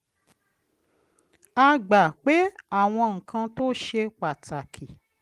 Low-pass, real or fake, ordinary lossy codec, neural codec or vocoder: 14.4 kHz; real; Opus, 24 kbps; none